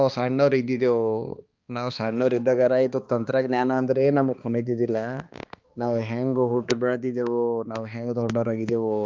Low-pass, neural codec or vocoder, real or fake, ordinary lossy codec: 7.2 kHz; codec, 16 kHz, 2 kbps, X-Codec, HuBERT features, trained on balanced general audio; fake; Opus, 24 kbps